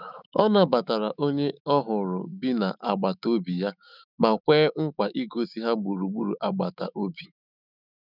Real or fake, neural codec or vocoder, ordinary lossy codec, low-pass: fake; autoencoder, 48 kHz, 128 numbers a frame, DAC-VAE, trained on Japanese speech; none; 5.4 kHz